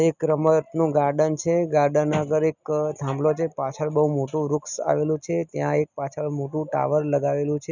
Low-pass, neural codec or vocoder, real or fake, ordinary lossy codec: 7.2 kHz; none; real; none